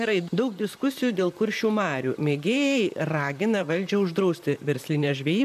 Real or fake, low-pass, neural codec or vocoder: fake; 14.4 kHz; vocoder, 44.1 kHz, 128 mel bands, Pupu-Vocoder